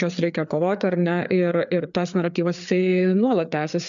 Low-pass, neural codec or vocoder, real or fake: 7.2 kHz; codec, 16 kHz, 4 kbps, FreqCodec, larger model; fake